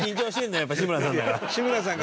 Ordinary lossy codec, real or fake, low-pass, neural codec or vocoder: none; real; none; none